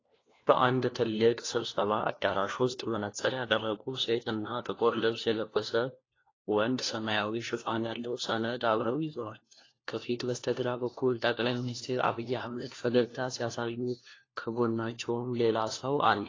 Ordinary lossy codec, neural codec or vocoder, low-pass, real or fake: AAC, 32 kbps; codec, 16 kHz, 1 kbps, FunCodec, trained on LibriTTS, 50 frames a second; 7.2 kHz; fake